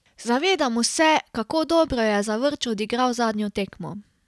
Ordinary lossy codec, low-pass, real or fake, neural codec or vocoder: none; none; real; none